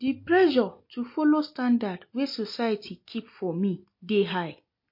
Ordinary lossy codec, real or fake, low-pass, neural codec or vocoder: MP3, 32 kbps; real; 5.4 kHz; none